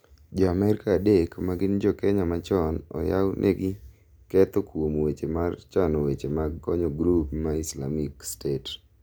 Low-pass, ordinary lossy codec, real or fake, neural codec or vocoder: none; none; real; none